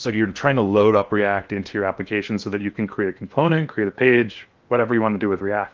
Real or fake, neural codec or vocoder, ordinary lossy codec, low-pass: fake; codec, 16 kHz in and 24 kHz out, 0.8 kbps, FocalCodec, streaming, 65536 codes; Opus, 32 kbps; 7.2 kHz